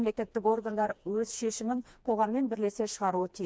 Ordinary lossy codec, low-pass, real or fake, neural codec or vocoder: none; none; fake; codec, 16 kHz, 2 kbps, FreqCodec, smaller model